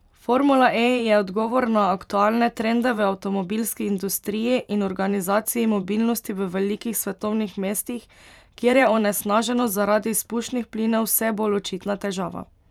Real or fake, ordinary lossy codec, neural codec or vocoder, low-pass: fake; none; vocoder, 44.1 kHz, 128 mel bands every 512 samples, BigVGAN v2; 19.8 kHz